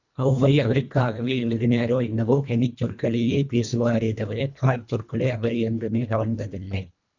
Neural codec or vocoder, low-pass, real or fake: codec, 24 kHz, 1.5 kbps, HILCodec; 7.2 kHz; fake